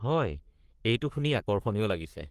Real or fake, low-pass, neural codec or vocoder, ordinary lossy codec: fake; 14.4 kHz; codec, 44.1 kHz, 3.4 kbps, Pupu-Codec; Opus, 24 kbps